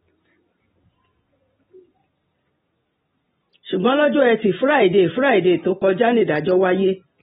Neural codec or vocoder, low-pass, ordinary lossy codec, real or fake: none; 19.8 kHz; AAC, 16 kbps; real